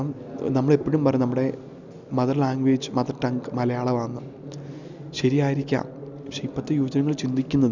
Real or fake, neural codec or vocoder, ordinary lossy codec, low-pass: real; none; none; 7.2 kHz